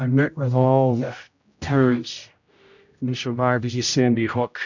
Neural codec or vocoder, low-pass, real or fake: codec, 16 kHz, 0.5 kbps, X-Codec, HuBERT features, trained on general audio; 7.2 kHz; fake